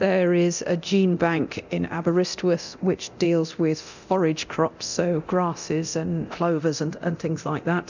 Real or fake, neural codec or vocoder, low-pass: fake; codec, 24 kHz, 0.9 kbps, DualCodec; 7.2 kHz